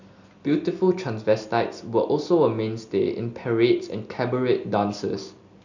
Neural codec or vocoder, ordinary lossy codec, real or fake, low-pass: none; none; real; 7.2 kHz